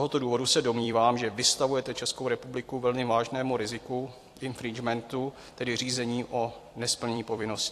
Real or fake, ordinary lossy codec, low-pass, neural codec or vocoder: fake; AAC, 64 kbps; 14.4 kHz; vocoder, 44.1 kHz, 128 mel bands every 256 samples, BigVGAN v2